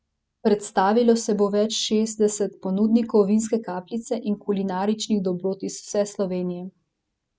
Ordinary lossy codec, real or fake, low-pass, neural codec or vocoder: none; real; none; none